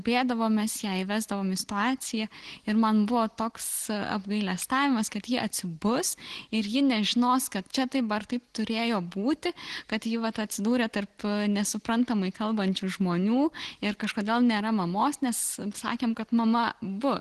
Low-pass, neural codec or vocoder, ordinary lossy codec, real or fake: 10.8 kHz; none; Opus, 16 kbps; real